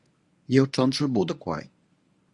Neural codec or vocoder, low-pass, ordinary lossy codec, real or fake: codec, 24 kHz, 0.9 kbps, WavTokenizer, medium speech release version 1; 10.8 kHz; Opus, 64 kbps; fake